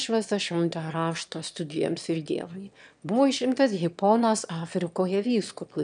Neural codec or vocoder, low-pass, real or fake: autoencoder, 22.05 kHz, a latent of 192 numbers a frame, VITS, trained on one speaker; 9.9 kHz; fake